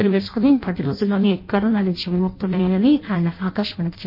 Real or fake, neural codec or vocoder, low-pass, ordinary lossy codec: fake; codec, 16 kHz in and 24 kHz out, 0.6 kbps, FireRedTTS-2 codec; 5.4 kHz; MP3, 32 kbps